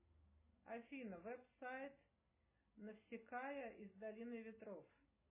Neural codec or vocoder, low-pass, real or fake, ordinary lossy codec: none; 3.6 kHz; real; MP3, 16 kbps